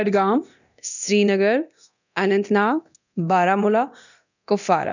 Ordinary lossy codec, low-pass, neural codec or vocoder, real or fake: none; 7.2 kHz; codec, 24 kHz, 0.9 kbps, DualCodec; fake